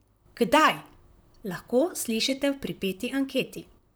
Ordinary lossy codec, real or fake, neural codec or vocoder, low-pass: none; fake; vocoder, 44.1 kHz, 128 mel bands, Pupu-Vocoder; none